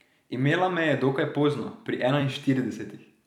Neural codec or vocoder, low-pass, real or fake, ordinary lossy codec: vocoder, 44.1 kHz, 128 mel bands every 256 samples, BigVGAN v2; 19.8 kHz; fake; none